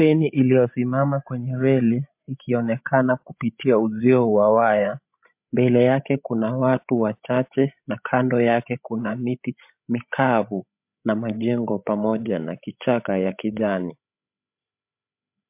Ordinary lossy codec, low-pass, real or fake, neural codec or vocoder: MP3, 32 kbps; 3.6 kHz; fake; codec, 16 kHz, 16 kbps, FreqCodec, larger model